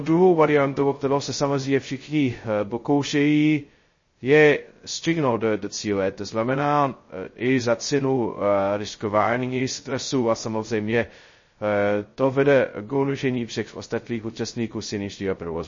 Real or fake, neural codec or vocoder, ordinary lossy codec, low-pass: fake; codec, 16 kHz, 0.2 kbps, FocalCodec; MP3, 32 kbps; 7.2 kHz